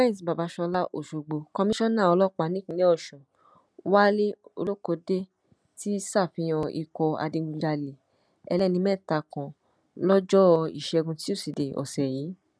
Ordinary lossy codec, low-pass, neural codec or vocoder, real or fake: none; none; none; real